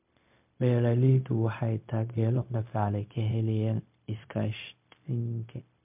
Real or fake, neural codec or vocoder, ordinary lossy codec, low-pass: fake; codec, 16 kHz, 0.4 kbps, LongCat-Audio-Codec; MP3, 32 kbps; 3.6 kHz